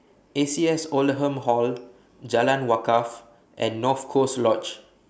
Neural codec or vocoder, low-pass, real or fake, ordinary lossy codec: none; none; real; none